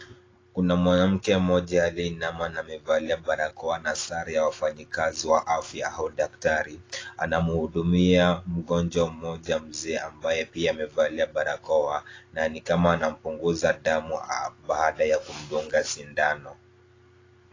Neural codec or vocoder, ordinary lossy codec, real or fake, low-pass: none; AAC, 32 kbps; real; 7.2 kHz